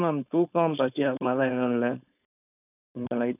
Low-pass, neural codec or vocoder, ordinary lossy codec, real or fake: 3.6 kHz; codec, 16 kHz, 4.8 kbps, FACodec; none; fake